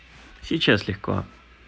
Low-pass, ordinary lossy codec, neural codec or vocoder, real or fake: none; none; none; real